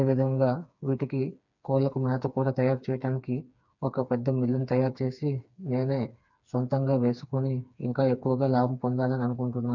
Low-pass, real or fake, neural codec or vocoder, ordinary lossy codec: 7.2 kHz; fake; codec, 16 kHz, 4 kbps, FreqCodec, smaller model; none